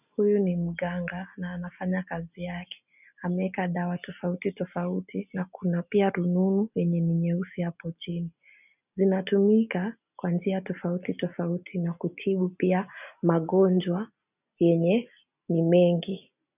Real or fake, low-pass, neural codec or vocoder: real; 3.6 kHz; none